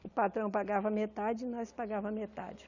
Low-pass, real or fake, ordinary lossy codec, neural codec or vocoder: 7.2 kHz; real; none; none